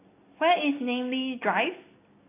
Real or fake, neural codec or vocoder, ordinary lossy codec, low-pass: real; none; AAC, 24 kbps; 3.6 kHz